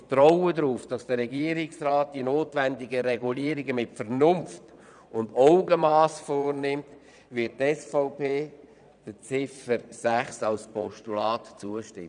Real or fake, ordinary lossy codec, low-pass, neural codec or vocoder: fake; none; 9.9 kHz; vocoder, 22.05 kHz, 80 mel bands, Vocos